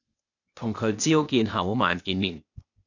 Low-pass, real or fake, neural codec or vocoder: 7.2 kHz; fake; codec, 16 kHz, 0.8 kbps, ZipCodec